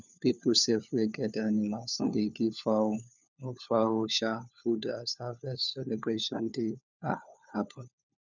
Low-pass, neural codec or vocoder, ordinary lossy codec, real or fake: 7.2 kHz; codec, 16 kHz, 4 kbps, FunCodec, trained on LibriTTS, 50 frames a second; none; fake